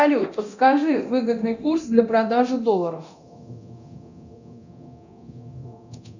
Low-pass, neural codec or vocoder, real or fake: 7.2 kHz; codec, 24 kHz, 0.9 kbps, DualCodec; fake